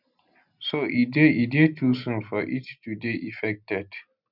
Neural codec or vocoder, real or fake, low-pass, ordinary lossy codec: none; real; 5.4 kHz; none